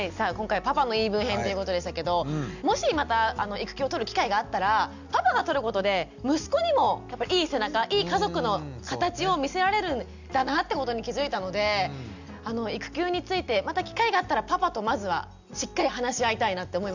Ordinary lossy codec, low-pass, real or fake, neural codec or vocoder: none; 7.2 kHz; real; none